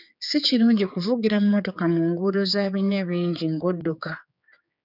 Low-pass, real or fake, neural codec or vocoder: 5.4 kHz; fake; codec, 16 kHz, 4 kbps, X-Codec, HuBERT features, trained on general audio